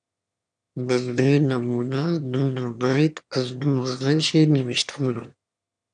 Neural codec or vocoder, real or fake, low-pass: autoencoder, 22.05 kHz, a latent of 192 numbers a frame, VITS, trained on one speaker; fake; 9.9 kHz